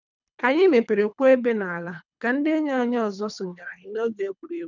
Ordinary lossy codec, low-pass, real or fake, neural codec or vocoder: none; 7.2 kHz; fake; codec, 24 kHz, 3 kbps, HILCodec